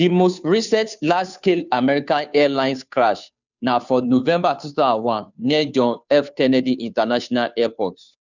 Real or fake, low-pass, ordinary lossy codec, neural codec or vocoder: fake; 7.2 kHz; none; codec, 16 kHz, 2 kbps, FunCodec, trained on Chinese and English, 25 frames a second